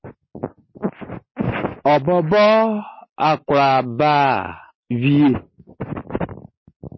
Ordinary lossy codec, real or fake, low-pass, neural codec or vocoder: MP3, 24 kbps; real; 7.2 kHz; none